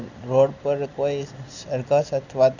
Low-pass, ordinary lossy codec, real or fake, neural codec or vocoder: 7.2 kHz; none; real; none